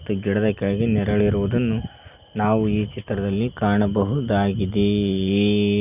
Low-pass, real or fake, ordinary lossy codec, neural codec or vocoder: 3.6 kHz; real; none; none